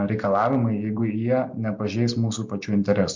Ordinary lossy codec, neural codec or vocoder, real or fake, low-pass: MP3, 48 kbps; none; real; 7.2 kHz